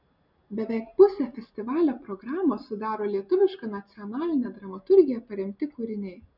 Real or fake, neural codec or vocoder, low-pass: real; none; 5.4 kHz